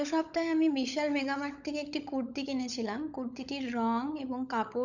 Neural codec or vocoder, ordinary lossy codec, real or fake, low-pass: codec, 16 kHz, 16 kbps, FunCodec, trained on LibriTTS, 50 frames a second; none; fake; 7.2 kHz